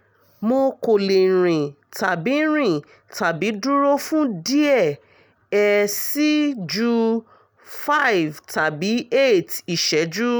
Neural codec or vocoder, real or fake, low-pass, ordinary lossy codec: none; real; none; none